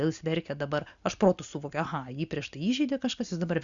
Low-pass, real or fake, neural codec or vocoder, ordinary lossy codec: 7.2 kHz; real; none; Opus, 64 kbps